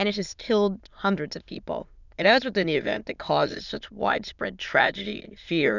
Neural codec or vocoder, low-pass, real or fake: autoencoder, 22.05 kHz, a latent of 192 numbers a frame, VITS, trained on many speakers; 7.2 kHz; fake